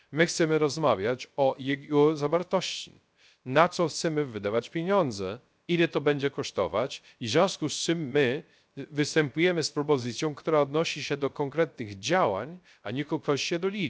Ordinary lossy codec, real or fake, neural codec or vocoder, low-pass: none; fake; codec, 16 kHz, 0.3 kbps, FocalCodec; none